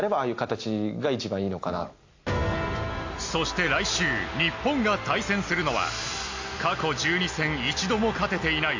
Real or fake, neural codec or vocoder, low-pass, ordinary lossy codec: real; none; 7.2 kHz; AAC, 48 kbps